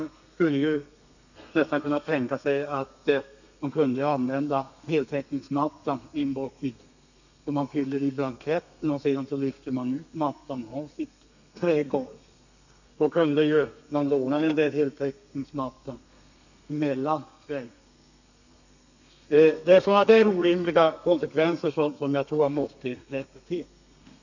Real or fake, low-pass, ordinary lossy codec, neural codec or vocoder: fake; 7.2 kHz; none; codec, 32 kHz, 1.9 kbps, SNAC